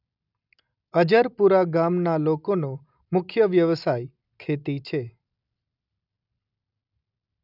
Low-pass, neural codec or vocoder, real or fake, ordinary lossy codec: 5.4 kHz; none; real; none